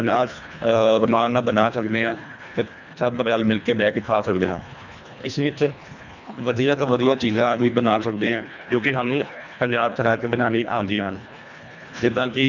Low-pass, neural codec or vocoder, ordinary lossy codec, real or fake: 7.2 kHz; codec, 24 kHz, 1.5 kbps, HILCodec; none; fake